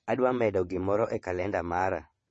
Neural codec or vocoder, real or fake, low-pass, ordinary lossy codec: vocoder, 22.05 kHz, 80 mel bands, WaveNeXt; fake; 9.9 kHz; MP3, 32 kbps